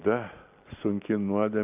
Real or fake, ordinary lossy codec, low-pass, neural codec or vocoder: real; AAC, 32 kbps; 3.6 kHz; none